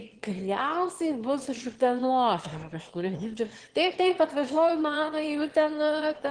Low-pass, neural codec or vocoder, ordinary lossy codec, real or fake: 9.9 kHz; autoencoder, 22.05 kHz, a latent of 192 numbers a frame, VITS, trained on one speaker; Opus, 16 kbps; fake